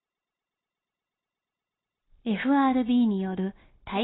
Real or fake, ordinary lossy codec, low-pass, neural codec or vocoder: fake; AAC, 16 kbps; 7.2 kHz; codec, 16 kHz, 0.9 kbps, LongCat-Audio-Codec